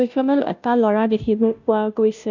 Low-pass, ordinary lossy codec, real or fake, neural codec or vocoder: 7.2 kHz; none; fake; codec, 16 kHz, 0.5 kbps, FunCodec, trained on Chinese and English, 25 frames a second